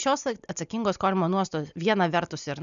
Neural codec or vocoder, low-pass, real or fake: none; 7.2 kHz; real